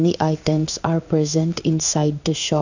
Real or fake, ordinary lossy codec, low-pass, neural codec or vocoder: fake; none; 7.2 kHz; codec, 16 kHz in and 24 kHz out, 1 kbps, XY-Tokenizer